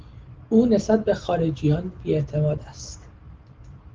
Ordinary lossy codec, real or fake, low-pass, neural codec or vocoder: Opus, 16 kbps; real; 7.2 kHz; none